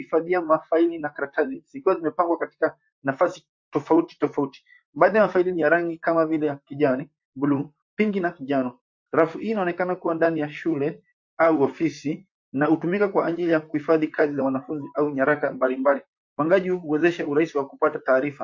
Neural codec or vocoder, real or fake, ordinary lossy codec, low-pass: vocoder, 44.1 kHz, 128 mel bands, Pupu-Vocoder; fake; MP3, 48 kbps; 7.2 kHz